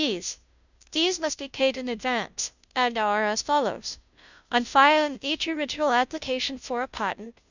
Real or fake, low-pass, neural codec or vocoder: fake; 7.2 kHz; codec, 16 kHz, 0.5 kbps, FunCodec, trained on Chinese and English, 25 frames a second